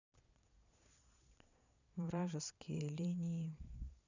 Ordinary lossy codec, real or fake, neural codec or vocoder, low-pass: none; fake; vocoder, 22.05 kHz, 80 mel bands, Vocos; 7.2 kHz